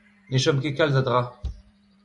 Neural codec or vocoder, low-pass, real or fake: vocoder, 44.1 kHz, 128 mel bands every 512 samples, BigVGAN v2; 10.8 kHz; fake